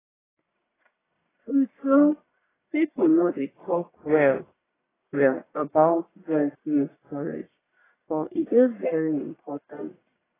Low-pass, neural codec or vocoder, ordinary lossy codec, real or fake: 3.6 kHz; codec, 44.1 kHz, 1.7 kbps, Pupu-Codec; AAC, 16 kbps; fake